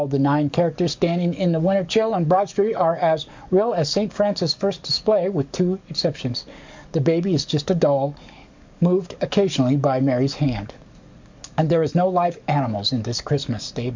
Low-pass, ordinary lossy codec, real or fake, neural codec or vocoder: 7.2 kHz; MP3, 64 kbps; fake; codec, 44.1 kHz, 7.8 kbps, DAC